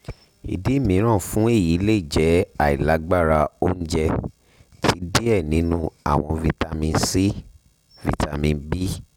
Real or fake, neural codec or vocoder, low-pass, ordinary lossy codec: real; none; 19.8 kHz; none